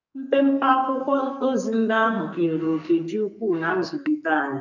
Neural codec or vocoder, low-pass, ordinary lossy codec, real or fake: codec, 44.1 kHz, 2.6 kbps, SNAC; 7.2 kHz; none; fake